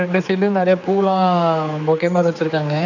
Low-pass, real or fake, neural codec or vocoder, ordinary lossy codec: 7.2 kHz; fake; codec, 16 kHz, 4 kbps, X-Codec, HuBERT features, trained on general audio; none